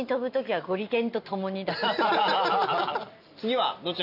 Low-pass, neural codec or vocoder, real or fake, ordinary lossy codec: 5.4 kHz; none; real; none